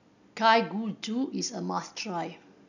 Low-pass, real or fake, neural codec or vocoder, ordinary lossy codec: 7.2 kHz; real; none; none